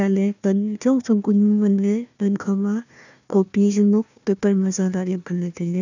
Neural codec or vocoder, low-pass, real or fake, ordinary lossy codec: codec, 16 kHz, 1 kbps, FunCodec, trained on Chinese and English, 50 frames a second; 7.2 kHz; fake; none